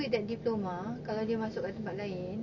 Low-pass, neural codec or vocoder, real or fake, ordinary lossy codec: 7.2 kHz; none; real; none